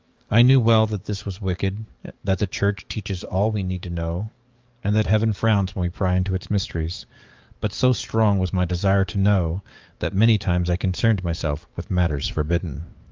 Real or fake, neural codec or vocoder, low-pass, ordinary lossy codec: fake; codec, 44.1 kHz, 7.8 kbps, DAC; 7.2 kHz; Opus, 24 kbps